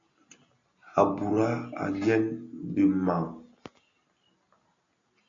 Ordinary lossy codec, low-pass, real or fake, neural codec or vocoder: MP3, 64 kbps; 7.2 kHz; real; none